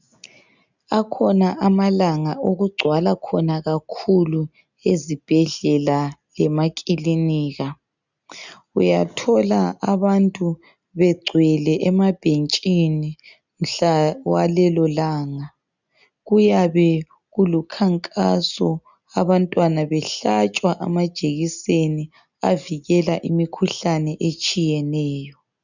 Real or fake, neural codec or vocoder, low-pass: real; none; 7.2 kHz